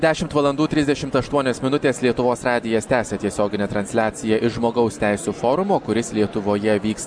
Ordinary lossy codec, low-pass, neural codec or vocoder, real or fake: MP3, 96 kbps; 9.9 kHz; none; real